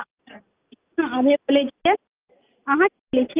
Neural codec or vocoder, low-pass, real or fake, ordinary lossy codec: none; 3.6 kHz; real; Opus, 32 kbps